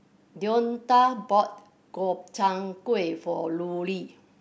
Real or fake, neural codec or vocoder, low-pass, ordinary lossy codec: real; none; none; none